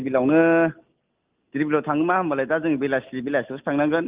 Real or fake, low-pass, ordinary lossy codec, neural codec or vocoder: real; 3.6 kHz; Opus, 24 kbps; none